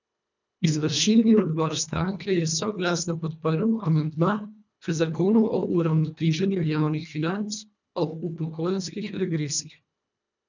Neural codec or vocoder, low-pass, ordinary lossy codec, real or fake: codec, 24 kHz, 1.5 kbps, HILCodec; 7.2 kHz; none; fake